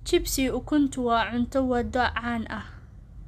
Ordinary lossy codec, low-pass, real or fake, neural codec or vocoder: none; 14.4 kHz; real; none